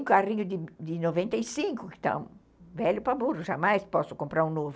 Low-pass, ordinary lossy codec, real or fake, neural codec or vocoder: none; none; real; none